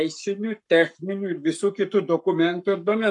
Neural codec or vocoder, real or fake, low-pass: codec, 44.1 kHz, 7.8 kbps, Pupu-Codec; fake; 10.8 kHz